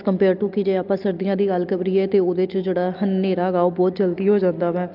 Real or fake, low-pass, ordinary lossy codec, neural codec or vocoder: real; 5.4 kHz; Opus, 24 kbps; none